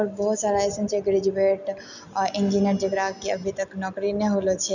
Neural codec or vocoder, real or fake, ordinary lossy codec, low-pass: none; real; none; 7.2 kHz